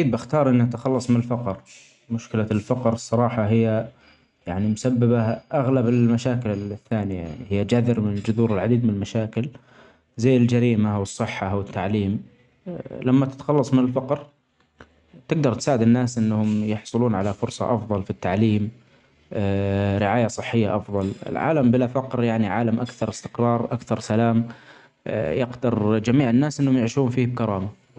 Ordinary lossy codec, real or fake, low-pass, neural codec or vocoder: none; real; 10.8 kHz; none